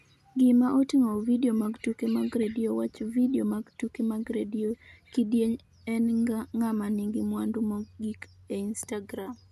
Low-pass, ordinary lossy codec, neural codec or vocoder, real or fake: 14.4 kHz; none; none; real